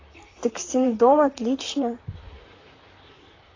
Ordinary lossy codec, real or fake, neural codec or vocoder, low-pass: AAC, 32 kbps; fake; vocoder, 44.1 kHz, 128 mel bands, Pupu-Vocoder; 7.2 kHz